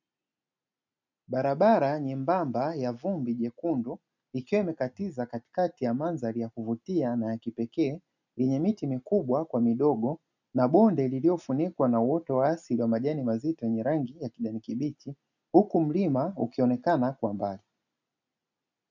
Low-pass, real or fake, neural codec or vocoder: 7.2 kHz; real; none